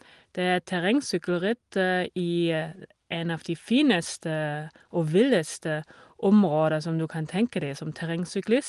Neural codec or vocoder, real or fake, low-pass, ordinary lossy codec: none; real; 10.8 kHz; Opus, 24 kbps